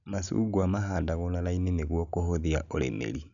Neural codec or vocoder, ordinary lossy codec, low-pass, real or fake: none; none; 7.2 kHz; real